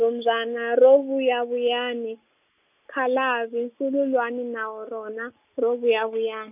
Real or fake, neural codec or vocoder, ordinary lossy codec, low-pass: real; none; none; 3.6 kHz